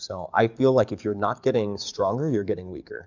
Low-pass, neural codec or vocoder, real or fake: 7.2 kHz; codec, 44.1 kHz, 7.8 kbps, DAC; fake